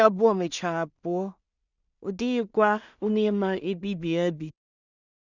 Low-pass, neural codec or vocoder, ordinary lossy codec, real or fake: 7.2 kHz; codec, 16 kHz in and 24 kHz out, 0.4 kbps, LongCat-Audio-Codec, two codebook decoder; none; fake